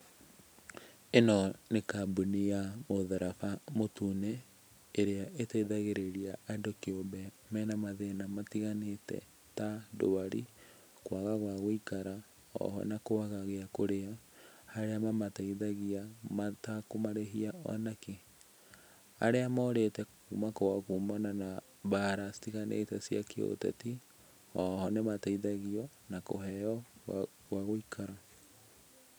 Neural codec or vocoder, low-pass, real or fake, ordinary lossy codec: none; none; real; none